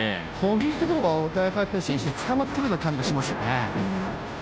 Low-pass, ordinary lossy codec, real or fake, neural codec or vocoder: none; none; fake; codec, 16 kHz, 0.5 kbps, FunCodec, trained on Chinese and English, 25 frames a second